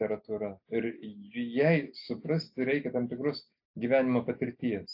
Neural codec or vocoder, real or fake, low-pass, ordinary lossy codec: none; real; 5.4 kHz; AAC, 48 kbps